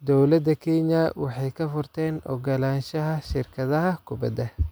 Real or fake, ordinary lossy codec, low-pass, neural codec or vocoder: real; none; none; none